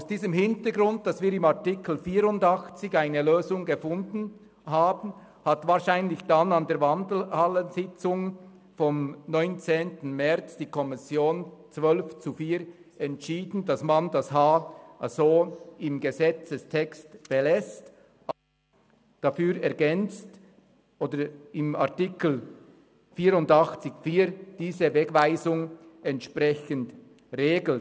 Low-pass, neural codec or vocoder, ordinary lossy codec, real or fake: none; none; none; real